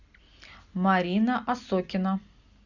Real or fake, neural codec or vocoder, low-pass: real; none; 7.2 kHz